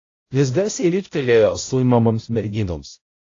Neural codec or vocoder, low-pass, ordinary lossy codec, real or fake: codec, 16 kHz, 0.5 kbps, X-Codec, HuBERT features, trained on balanced general audio; 7.2 kHz; AAC, 32 kbps; fake